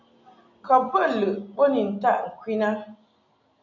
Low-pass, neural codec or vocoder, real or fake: 7.2 kHz; none; real